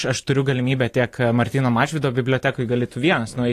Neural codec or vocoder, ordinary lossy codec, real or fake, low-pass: vocoder, 44.1 kHz, 128 mel bands every 512 samples, BigVGAN v2; AAC, 48 kbps; fake; 14.4 kHz